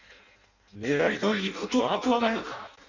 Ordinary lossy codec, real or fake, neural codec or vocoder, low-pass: none; fake; codec, 16 kHz in and 24 kHz out, 0.6 kbps, FireRedTTS-2 codec; 7.2 kHz